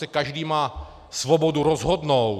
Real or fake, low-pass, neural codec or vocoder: real; 14.4 kHz; none